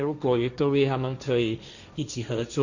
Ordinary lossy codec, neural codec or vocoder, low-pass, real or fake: none; codec, 16 kHz, 1.1 kbps, Voila-Tokenizer; 7.2 kHz; fake